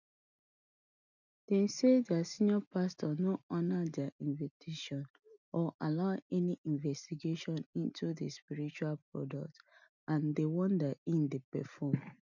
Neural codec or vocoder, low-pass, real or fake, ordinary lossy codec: none; 7.2 kHz; real; none